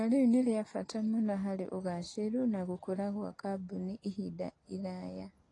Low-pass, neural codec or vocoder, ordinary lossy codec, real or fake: 10.8 kHz; none; AAC, 32 kbps; real